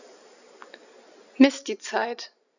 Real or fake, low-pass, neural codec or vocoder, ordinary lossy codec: real; 7.2 kHz; none; none